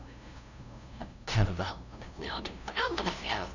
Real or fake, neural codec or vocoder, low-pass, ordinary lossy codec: fake; codec, 16 kHz, 0.5 kbps, FunCodec, trained on LibriTTS, 25 frames a second; 7.2 kHz; none